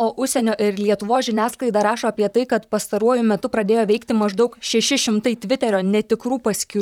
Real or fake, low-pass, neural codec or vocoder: fake; 19.8 kHz; vocoder, 44.1 kHz, 128 mel bands, Pupu-Vocoder